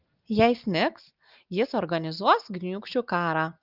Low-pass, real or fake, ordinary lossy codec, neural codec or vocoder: 5.4 kHz; real; Opus, 32 kbps; none